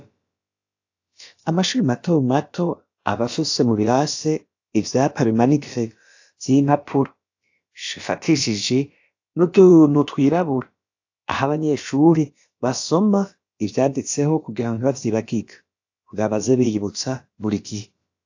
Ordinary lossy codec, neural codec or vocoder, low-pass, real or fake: AAC, 48 kbps; codec, 16 kHz, about 1 kbps, DyCAST, with the encoder's durations; 7.2 kHz; fake